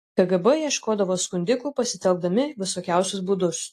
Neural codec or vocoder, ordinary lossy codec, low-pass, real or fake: none; AAC, 48 kbps; 14.4 kHz; real